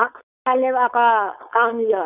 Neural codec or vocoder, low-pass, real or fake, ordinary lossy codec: codec, 16 kHz, 4.8 kbps, FACodec; 3.6 kHz; fake; none